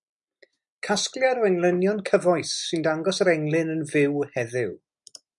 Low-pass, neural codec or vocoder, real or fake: 10.8 kHz; none; real